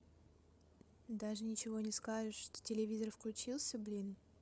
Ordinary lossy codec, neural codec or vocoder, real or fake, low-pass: none; codec, 16 kHz, 8 kbps, FreqCodec, larger model; fake; none